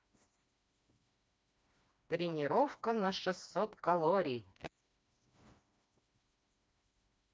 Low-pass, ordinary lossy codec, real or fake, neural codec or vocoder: none; none; fake; codec, 16 kHz, 2 kbps, FreqCodec, smaller model